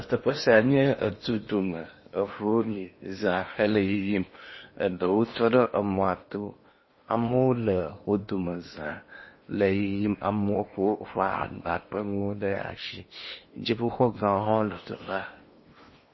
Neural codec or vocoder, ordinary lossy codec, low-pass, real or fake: codec, 16 kHz in and 24 kHz out, 0.8 kbps, FocalCodec, streaming, 65536 codes; MP3, 24 kbps; 7.2 kHz; fake